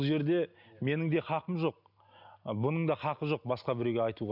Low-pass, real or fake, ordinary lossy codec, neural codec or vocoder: 5.4 kHz; real; none; none